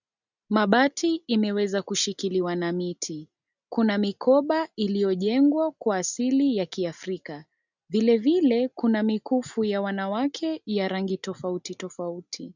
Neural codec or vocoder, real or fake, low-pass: none; real; 7.2 kHz